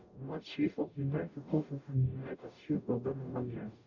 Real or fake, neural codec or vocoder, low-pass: fake; codec, 44.1 kHz, 0.9 kbps, DAC; 7.2 kHz